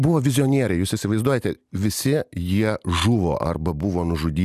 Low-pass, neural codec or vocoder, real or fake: 14.4 kHz; none; real